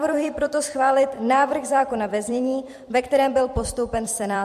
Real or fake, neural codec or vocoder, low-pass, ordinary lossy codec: fake; vocoder, 44.1 kHz, 128 mel bands every 256 samples, BigVGAN v2; 14.4 kHz; MP3, 64 kbps